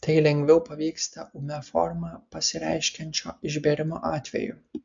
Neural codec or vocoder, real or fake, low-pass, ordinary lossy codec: none; real; 7.2 kHz; MP3, 64 kbps